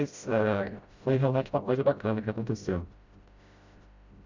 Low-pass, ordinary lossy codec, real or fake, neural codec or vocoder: 7.2 kHz; Opus, 64 kbps; fake; codec, 16 kHz, 0.5 kbps, FreqCodec, smaller model